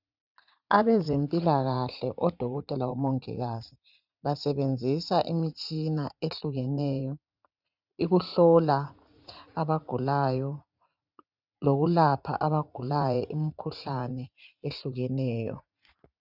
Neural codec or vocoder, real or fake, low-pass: vocoder, 44.1 kHz, 80 mel bands, Vocos; fake; 5.4 kHz